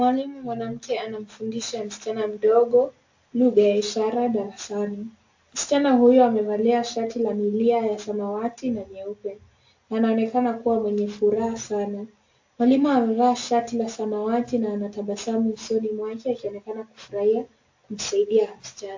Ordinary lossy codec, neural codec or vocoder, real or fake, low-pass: AAC, 48 kbps; none; real; 7.2 kHz